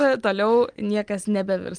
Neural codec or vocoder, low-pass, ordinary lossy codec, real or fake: none; 9.9 kHz; Opus, 32 kbps; real